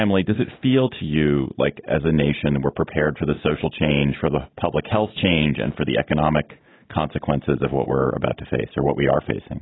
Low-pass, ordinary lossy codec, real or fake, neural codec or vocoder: 7.2 kHz; AAC, 16 kbps; real; none